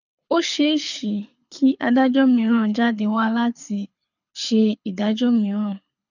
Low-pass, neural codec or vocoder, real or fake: 7.2 kHz; codec, 24 kHz, 6 kbps, HILCodec; fake